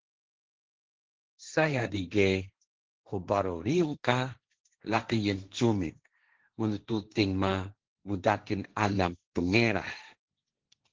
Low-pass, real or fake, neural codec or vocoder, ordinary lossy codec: 7.2 kHz; fake; codec, 16 kHz, 1.1 kbps, Voila-Tokenizer; Opus, 16 kbps